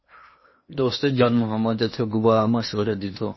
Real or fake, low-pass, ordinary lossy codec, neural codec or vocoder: fake; 7.2 kHz; MP3, 24 kbps; codec, 16 kHz in and 24 kHz out, 0.8 kbps, FocalCodec, streaming, 65536 codes